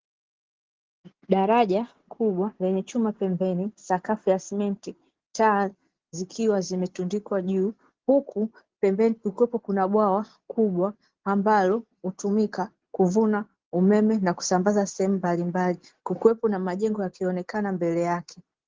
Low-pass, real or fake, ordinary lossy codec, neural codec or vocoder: 7.2 kHz; real; Opus, 16 kbps; none